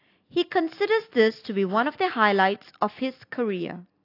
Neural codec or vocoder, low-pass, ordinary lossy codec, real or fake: none; 5.4 kHz; AAC, 32 kbps; real